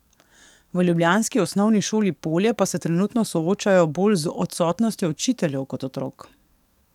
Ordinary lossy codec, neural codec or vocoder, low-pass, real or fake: none; codec, 44.1 kHz, 7.8 kbps, DAC; 19.8 kHz; fake